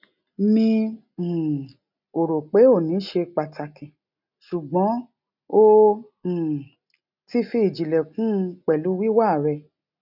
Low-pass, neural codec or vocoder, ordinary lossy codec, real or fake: 5.4 kHz; none; none; real